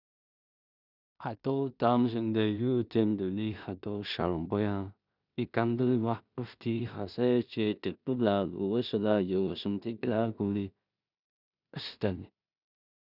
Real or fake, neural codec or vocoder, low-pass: fake; codec, 16 kHz in and 24 kHz out, 0.4 kbps, LongCat-Audio-Codec, two codebook decoder; 5.4 kHz